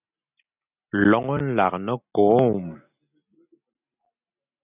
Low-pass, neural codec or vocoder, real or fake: 3.6 kHz; none; real